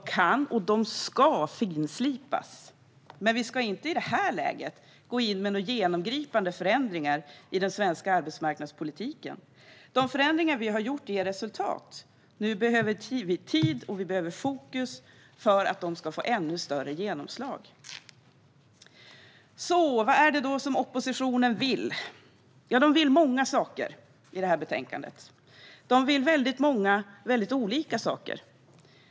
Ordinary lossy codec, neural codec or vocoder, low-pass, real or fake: none; none; none; real